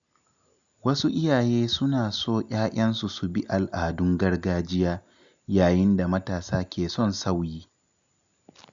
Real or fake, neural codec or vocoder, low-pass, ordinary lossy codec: real; none; 7.2 kHz; none